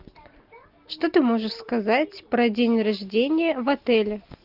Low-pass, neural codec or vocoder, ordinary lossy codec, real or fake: 5.4 kHz; none; Opus, 24 kbps; real